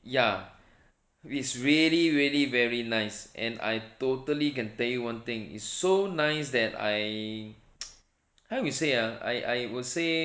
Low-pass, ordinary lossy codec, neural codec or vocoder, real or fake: none; none; none; real